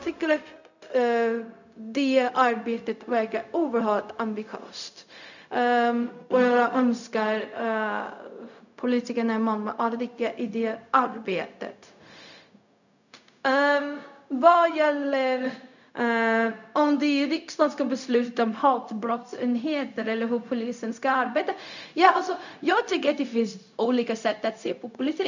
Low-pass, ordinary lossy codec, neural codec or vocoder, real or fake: 7.2 kHz; none; codec, 16 kHz, 0.4 kbps, LongCat-Audio-Codec; fake